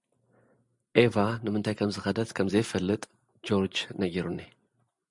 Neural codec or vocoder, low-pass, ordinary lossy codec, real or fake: none; 10.8 kHz; MP3, 96 kbps; real